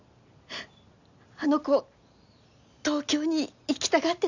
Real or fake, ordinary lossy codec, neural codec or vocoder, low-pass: real; none; none; 7.2 kHz